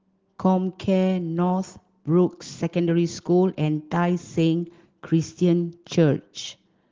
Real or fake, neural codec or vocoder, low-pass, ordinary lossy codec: real; none; 7.2 kHz; Opus, 16 kbps